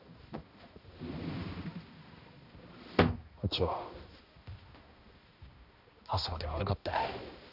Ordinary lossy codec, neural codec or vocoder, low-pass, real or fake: none; codec, 16 kHz, 1 kbps, X-Codec, HuBERT features, trained on balanced general audio; 5.4 kHz; fake